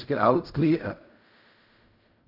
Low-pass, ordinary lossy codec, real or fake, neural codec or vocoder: 5.4 kHz; none; fake; codec, 16 kHz in and 24 kHz out, 0.4 kbps, LongCat-Audio-Codec, fine tuned four codebook decoder